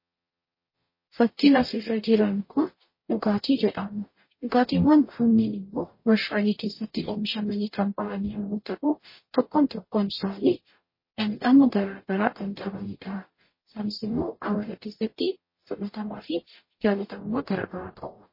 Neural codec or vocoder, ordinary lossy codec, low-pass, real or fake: codec, 44.1 kHz, 0.9 kbps, DAC; MP3, 24 kbps; 5.4 kHz; fake